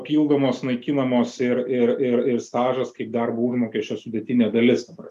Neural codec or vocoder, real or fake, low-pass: none; real; 14.4 kHz